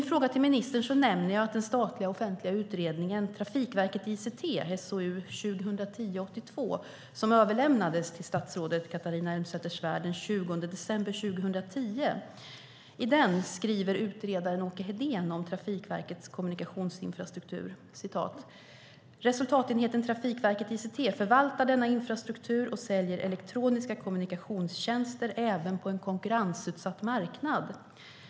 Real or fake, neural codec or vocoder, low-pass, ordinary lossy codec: real; none; none; none